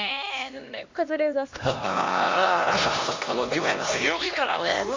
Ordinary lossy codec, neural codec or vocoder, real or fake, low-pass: MP3, 48 kbps; codec, 16 kHz, 1 kbps, X-Codec, HuBERT features, trained on LibriSpeech; fake; 7.2 kHz